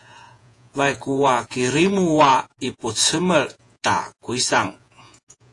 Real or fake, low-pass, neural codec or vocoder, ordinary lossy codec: fake; 10.8 kHz; vocoder, 48 kHz, 128 mel bands, Vocos; AAC, 48 kbps